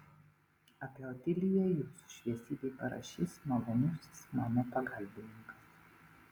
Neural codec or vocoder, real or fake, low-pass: none; real; 19.8 kHz